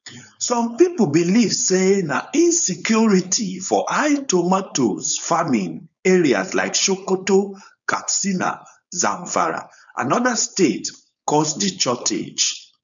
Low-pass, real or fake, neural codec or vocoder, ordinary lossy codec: 7.2 kHz; fake; codec, 16 kHz, 4.8 kbps, FACodec; none